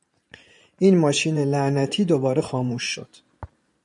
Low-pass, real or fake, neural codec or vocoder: 10.8 kHz; fake; vocoder, 44.1 kHz, 128 mel bands every 512 samples, BigVGAN v2